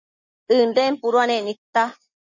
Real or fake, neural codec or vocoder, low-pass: real; none; 7.2 kHz